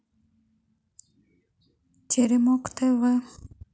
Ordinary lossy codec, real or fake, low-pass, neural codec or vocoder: none; real; none; none